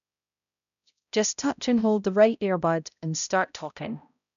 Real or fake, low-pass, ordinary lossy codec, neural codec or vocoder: fake; 7.2 kHz; none; codec, 16 kHz, 0.5 kbps, X-Codec, HuBERT features, trained on balanced general audio